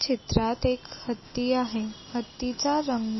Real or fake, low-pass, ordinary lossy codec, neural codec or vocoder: real; 7.2 kHz; MP3, 24 kbps; none